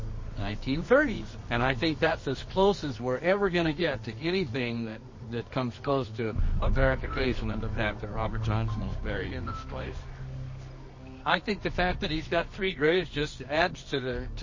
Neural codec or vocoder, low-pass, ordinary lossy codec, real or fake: codec, 24 kHz, 0.9 kbps, WavTokenizer, medium music audio release; 7.2 kHz; MP3, 32 kbps; fake